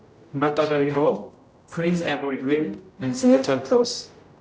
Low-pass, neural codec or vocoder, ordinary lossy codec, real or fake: none; codec, 16 kHz, 0.5 kbps, X-Codec, HuBERT features, trained on general audio; none; fake